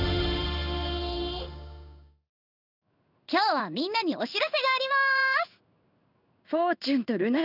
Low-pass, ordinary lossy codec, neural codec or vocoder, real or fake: 5.4 kHz; none; codec, 16 kHz in and 24 kHz out, 1 kbps, XY-Tokenizer; fake